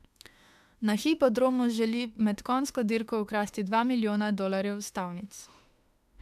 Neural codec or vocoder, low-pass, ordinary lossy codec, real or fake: autoencoder, 48 kHz, 32 numbers a frame, DAC-VAE, trained on Japanese speech; 14.4 kHz; AAC, 96 kbps; fake